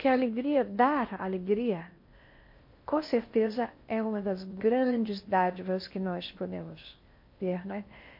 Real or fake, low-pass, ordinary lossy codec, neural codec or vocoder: fake; 5.4 kHz; MP3, 32 kbps; codec, 16 kHz in and 24 kHz out, 0.6 kbps, FocalCodec, streaming, 4096 codes